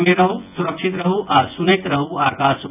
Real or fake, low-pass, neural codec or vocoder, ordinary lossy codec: fake; 3.6 kHz; vocoder, 24 kHz, 100 mel bands, Vocos; none